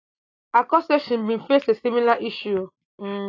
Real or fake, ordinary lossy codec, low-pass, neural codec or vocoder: real; AAC, 32 kbps; 7.2 kHz; none